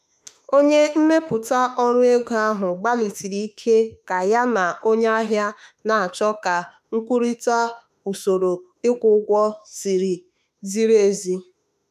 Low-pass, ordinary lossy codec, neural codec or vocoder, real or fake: 14.4 kHz; none; autoencoder, 48 kHz, 32 numbers a frame, DAC-VAE, trained on Japanese speech; fake